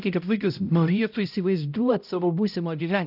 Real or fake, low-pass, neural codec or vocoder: fake; 5.4 kHz; codec, 16 kHz, 0.5 kbps, X-Codec, HuBERT features, trained on balanced general audio